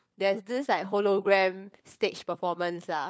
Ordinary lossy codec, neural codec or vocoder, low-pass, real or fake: none; codec, 16 kHz, 4 kbps, FunCodec, trained on LibriTTS, 50 frames a second; none; fake